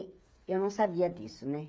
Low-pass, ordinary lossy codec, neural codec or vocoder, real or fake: none; none; codec, 16 kHz, 8 kbps, FreqCodec, smaller model; fake